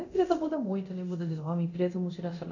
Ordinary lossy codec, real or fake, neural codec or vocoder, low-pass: none; fake; codec, 24 kHz, 0.9 kbps, DualCodec; 7.2 kHz